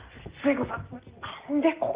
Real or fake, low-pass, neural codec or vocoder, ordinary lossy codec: real; 3.6 kHz; none; Opus, 32 kbps